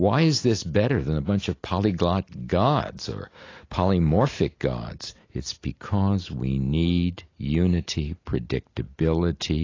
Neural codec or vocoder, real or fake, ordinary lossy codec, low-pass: none; real; AAC, 32 kbps; 7.2 kHz